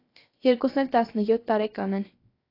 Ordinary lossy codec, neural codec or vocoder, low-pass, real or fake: AAC, 32 kbps; codec, 16 kHz, about 1 kbps, DyCAST, with the encoder's durations; 5.4 kHz; fake